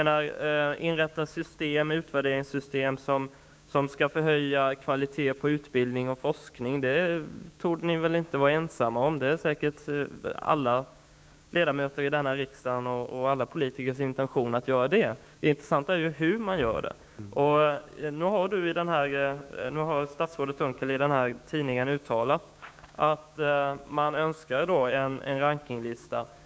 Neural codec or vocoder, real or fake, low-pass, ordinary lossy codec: codec, 16 kHz, 6 kbps, DAC; fake; none; none